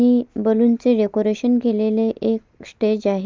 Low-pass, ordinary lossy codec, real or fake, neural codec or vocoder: 7.2 kHz; Opus, 24 kbps; real; none